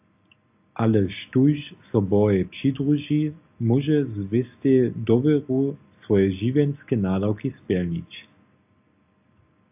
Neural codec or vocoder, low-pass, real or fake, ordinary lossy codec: none; 3.6 kHz; real; AAC, 32 kbps